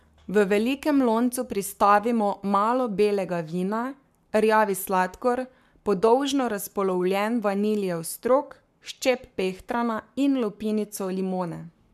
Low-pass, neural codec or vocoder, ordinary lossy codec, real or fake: 14.4 kHz; codec, 44.1 kHz, 7.8 kbps, Pupu-Codec; MP3, 96 kbps; fake